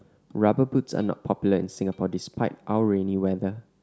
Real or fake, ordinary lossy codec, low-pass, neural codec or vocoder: real; none; none; none